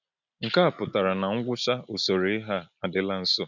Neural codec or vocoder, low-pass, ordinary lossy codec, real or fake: none; 7.2 kHz; none; real